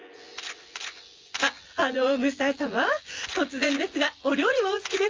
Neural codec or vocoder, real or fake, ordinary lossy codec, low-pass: vocoder, 24 kHz, 100 mel bands, Vocos; fake; Opus, 32 kbps; 7.2 kHz